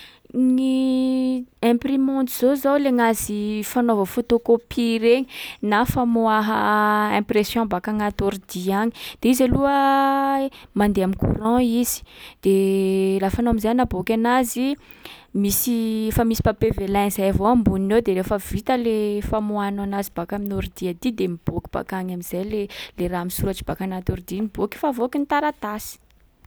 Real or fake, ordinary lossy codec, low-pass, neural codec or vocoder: real; none; none; none